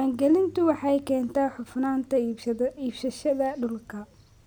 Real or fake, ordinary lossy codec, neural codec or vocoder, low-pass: real; none; none; none